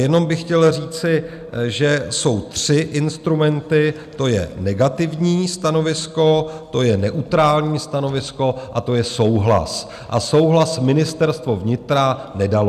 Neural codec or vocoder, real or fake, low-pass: none; real; 14.4 kHz